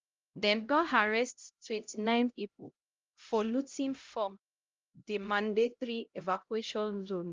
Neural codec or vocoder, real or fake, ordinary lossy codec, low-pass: codec, 16 kHz, 0.5 kbps, X-Codec, HuBERT features, trained on LibriSpeech; fake; Opus, 32 kbps; 7.2 kHz